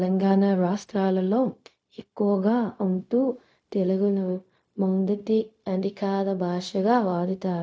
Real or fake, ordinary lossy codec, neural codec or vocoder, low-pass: fake; none; codec, 16 kHz, 0.4 kbps, LongCat-Audio-Codec; none